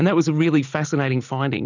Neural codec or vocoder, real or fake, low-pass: none; real; 7.2 kHz